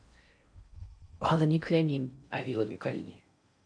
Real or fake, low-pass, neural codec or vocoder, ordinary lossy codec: fake; 9.9 kHz; codec, 16 kHz in and 24 kHz out, 0.6 kbps, FocalCodec, streaming, 4096 codes; MP3, 64 kbps